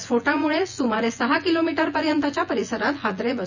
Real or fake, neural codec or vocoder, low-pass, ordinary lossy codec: fake; vocoder, 24 kHz, 100 mel bands, Vocos; 7.2 kHz; none